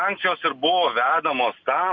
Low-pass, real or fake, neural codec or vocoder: 7.2 kHz; real; none